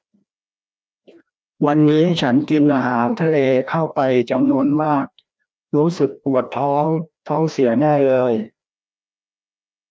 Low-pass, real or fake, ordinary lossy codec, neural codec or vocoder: none; fake; none; codec, 16 kHz, 1 kbps, FreqCodec, larger model